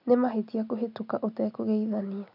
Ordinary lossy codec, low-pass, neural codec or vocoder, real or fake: none; 5.4 kHz; none; real